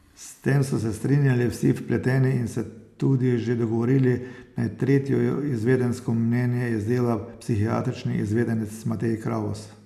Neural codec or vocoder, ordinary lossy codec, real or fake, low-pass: none; none; real; 14.4 kHz